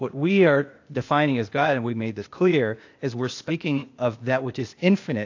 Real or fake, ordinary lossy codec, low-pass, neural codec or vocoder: fake; AAC, 48 kbps; 7.2 kHz; codec, 16 kHz, 0.8 kbps, ZipCodec